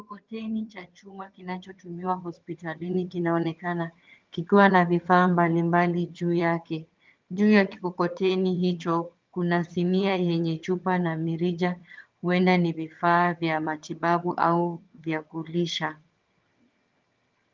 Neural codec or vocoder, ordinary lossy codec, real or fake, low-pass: vocoder, 22.05 kHz, 80 mel bands, HiFi-GAN; Opus, 32 kbps; fake; 7.2 kHz